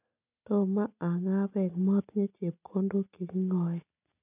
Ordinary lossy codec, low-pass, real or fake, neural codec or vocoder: none; 3.6 kHz; real; none